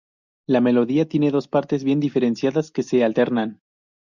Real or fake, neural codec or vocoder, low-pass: real; none; 7.2 kHz